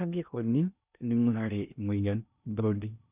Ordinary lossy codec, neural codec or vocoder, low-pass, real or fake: none; codec, 16 kHz in and 24 kHz out, 0.6 kbps, FocalCodec, streaming, 2048 codes; 3.6 kHz; fake